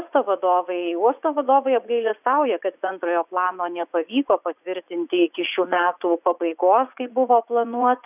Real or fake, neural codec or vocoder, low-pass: fake; vocoder, 44.1 kHz, 80 mel bands, Vocos; 3.6 kHz